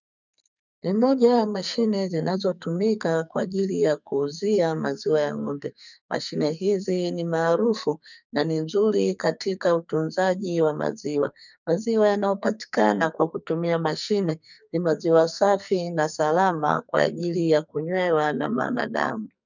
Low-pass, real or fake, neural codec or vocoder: 7.2 kHz; fake; codec, 44.1 kHz, 2.6 kbps, SNAC